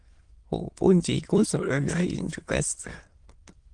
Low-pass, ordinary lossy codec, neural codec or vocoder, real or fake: 9.9 kHz; Opus, 24 kbps; autoencoder, 22.05 kHz, a latent of 192 numbers a frame, VITS, trained on many speakers; fake